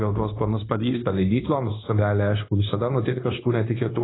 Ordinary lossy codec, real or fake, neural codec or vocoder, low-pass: AAC, 16 kbps; fake; codec, 16 kHz, 0.9 kbps, LongCat-Audio-Codec; 7.2 kHz